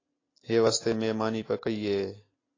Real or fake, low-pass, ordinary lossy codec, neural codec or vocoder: real; 7.2 kHz; AAC, 32 kbps; none